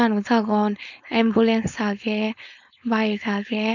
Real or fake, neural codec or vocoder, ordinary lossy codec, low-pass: fake; codec, 16 kHz, 4.8 kbps, FACodec; none; 7.2 kHz